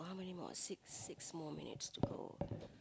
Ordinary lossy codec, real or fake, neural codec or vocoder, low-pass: none; real; none; none